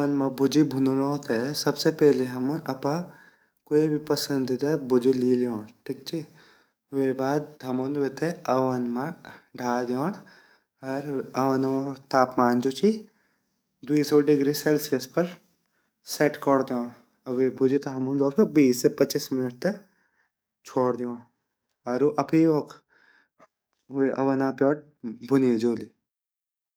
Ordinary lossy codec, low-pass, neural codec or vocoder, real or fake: none; none; codec, 44.1 kHz, 7.8 kbps, DAC; fake